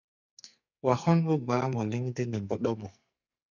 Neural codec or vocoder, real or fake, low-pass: codec, 44.1 kHz, 2.6 kbps, SNAC; fake; 7.2 kHz